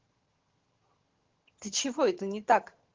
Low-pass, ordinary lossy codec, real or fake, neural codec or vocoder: 7.2 kHz; Opus, 16 kbps; fake; vocoder, 22.05 kHz, 80 mel bands, HiFi-GAN